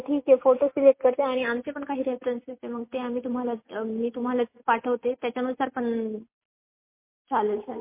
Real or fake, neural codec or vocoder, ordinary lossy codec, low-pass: real; none; MP3, 24 kbps; 3.6 kHz